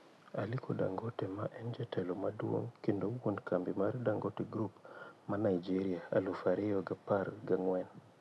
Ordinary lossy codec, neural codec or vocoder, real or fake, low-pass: none; none; real; none